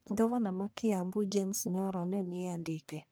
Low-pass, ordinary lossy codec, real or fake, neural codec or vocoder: none; none; fake; codec, 44.1 kHz, 1.7 kbps, Pupu-Codec